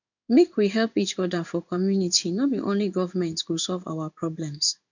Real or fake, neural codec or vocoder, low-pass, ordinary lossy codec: fake; codec, 16 kHz in and 24 kHz out, 1 kbps, XY-Tokenizer; 7.2 kHz; none